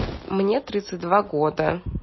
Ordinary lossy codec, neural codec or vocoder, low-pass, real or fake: MP3, 24 kbps; none; 7.2 kHz; real